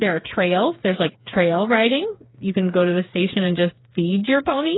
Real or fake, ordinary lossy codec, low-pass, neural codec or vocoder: fake; AAC, 16 kbps; 7.2 kHz; codec, 16 kHz, 4 kbps, FreqCodec, smaller model